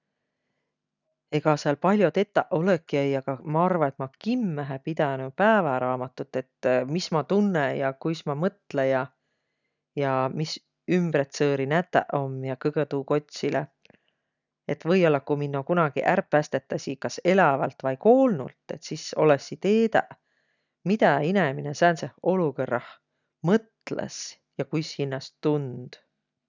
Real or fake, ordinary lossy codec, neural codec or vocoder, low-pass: real; none; none; 7.2 kHz